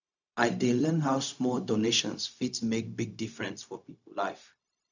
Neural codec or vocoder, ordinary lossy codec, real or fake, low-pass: codec, 16 kHz, 0.4 kbps, LongCat-Audio-Codec; none; fake; 7.2 kHz